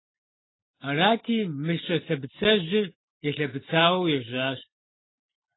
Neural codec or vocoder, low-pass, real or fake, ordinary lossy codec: none; 7.2 kHz; real; AAC, 16 kbps